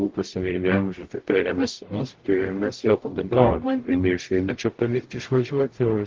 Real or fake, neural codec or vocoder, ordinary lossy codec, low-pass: fake; codec, 44.1 kHz, 0.9 kbps, DAC; Opus, 16 kbps; 7.2 kHz